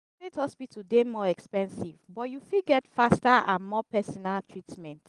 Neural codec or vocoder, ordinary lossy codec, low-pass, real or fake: none; Opus, 24 kbps; 10.8 kHz; real